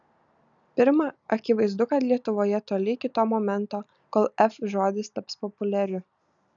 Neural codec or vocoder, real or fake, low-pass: none; real; 7.2 kHz